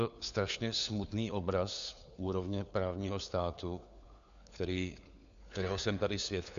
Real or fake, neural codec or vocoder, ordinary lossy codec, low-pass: fake; codec, 16 kHz, 4 kbps, FunCodec, trained on LibriTTS, 50 frames a second; AAC, 96 kbps; 7.2 kHz